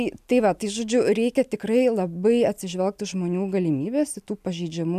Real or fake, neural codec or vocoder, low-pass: real; none; 14.4 kHz